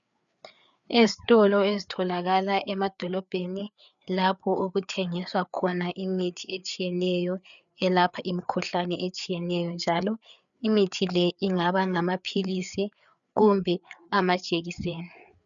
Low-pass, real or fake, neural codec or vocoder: 7.2 kHz; fake; codec, 16 kHz, 4 kbps, FreqCodec, larger model